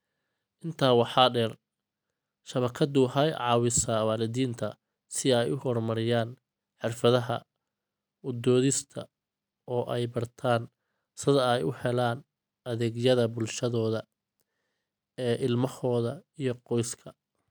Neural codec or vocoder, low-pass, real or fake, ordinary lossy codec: none; none; real; none